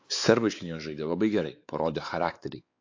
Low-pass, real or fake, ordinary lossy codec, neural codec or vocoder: 7.2 kHz; fake; AAC, 48 kbps; codec, 16 kHz, 4 kbps, X-Codec, WavLM features, trained on Multilingual LibriSpeech